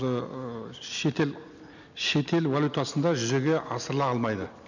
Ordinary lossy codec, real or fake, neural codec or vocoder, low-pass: none; real; none; 7.2 kHz